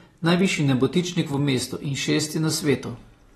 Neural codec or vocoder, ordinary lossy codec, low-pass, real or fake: none; AAC, 32 kbps; 19.8 kHz; real